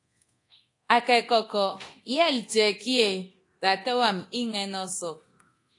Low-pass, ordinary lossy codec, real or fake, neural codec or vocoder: 10.8 kHz; AAC, 48 kbps; fake; codec, 24 kHz, 0.9 kbps, DualCodec